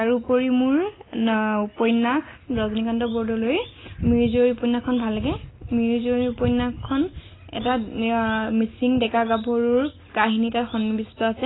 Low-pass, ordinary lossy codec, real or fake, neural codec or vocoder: 7.2 kHz; AAC, 16 kbps; real; none